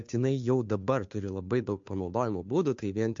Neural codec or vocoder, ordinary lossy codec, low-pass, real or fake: codec, 16 kHz, 2 kbps, FunCodec, trained on Chinese and English, 25 frames a second; MP3, 48 kbps; 7.2 kHz; fake